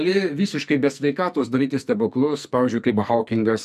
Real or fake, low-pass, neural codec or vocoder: fake; 14.4 kHz; codec, 44.1 kHz, 2.6 kbps, SNAC